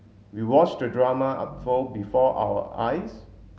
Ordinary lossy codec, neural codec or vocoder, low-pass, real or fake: none; none; none; real